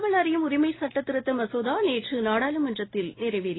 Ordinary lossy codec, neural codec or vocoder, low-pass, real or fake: AAC, 16 kbps; none; 7.2 kHz; real